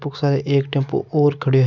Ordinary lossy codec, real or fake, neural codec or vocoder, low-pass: none; real; none; 7.2 kHz